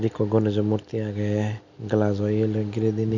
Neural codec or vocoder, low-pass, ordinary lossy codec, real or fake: none; 7.2 kHz; none; real